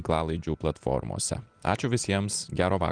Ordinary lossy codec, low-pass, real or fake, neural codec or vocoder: Opus, 24 kbps; 9.9 kHz; real; none